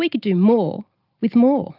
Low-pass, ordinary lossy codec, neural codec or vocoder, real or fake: 5.4 kHz; Opus, 32 kbps; none; real